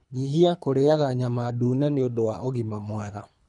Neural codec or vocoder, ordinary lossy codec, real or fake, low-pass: codec, 24 kHz, 3 kbps, HILCodec; none; fake; 10.8 kHz